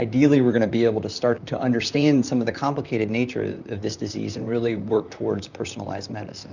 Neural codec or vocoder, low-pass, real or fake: vocoder, 44.1 kHz, 128 mel bands, Pupu-Vocoder; 7.2 kHz; fake